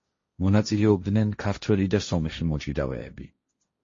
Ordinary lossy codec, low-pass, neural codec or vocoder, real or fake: MP3, 32 kbps; 7.2 kHz; codec, 16 kHz, 1.1 kbps, Voila-Tokenizer; fake